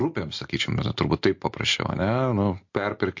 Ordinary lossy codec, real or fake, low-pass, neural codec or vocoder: MP3, 48 kbps; real; 7.2 kHz; none